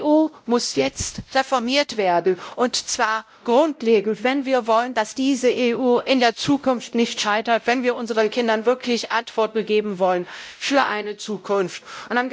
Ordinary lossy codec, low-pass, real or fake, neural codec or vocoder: none; none; fake; codec, 16 kHz, 0.5 kbps, X-Codec, WavLM features, trained on Multilingual LibriSpeech